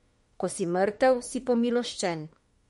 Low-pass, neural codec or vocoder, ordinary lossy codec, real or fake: 19.8 kHz; autoencoder, 48 kHz, 32 numbers a frame, DAC-VAE, trained on Japanese speech; MP3, 48 kbps; fake